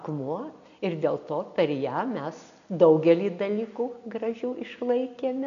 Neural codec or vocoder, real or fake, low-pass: none; real; 7.2 kHz